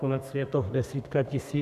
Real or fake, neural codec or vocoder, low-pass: fake; codec, 32 kHz, 1.9 kbps, SNAC; 14.4 kHz